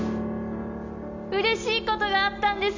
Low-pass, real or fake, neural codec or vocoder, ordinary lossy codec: 7.2 kHz; real; none; MP3, 64 kbps